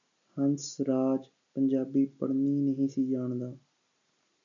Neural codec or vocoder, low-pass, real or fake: none; 7.2 kHz; real